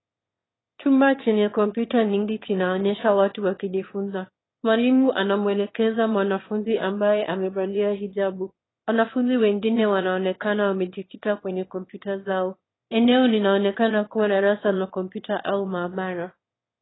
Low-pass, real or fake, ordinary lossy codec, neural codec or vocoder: 7.2 kHz; fake; AAC, 16 kbps; autoencoder, 22.05 kHz, a latent of 192 numbers a frame, VITS, trained on one speaker